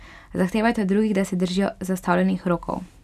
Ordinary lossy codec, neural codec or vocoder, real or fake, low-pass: none; none; real; 14.4 kHz